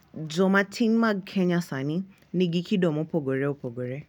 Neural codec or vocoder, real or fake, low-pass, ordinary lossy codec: none; real; 19.8 kHz; none